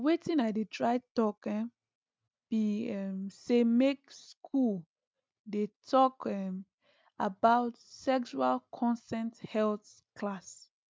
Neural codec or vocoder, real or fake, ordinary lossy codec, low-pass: none; real; none; none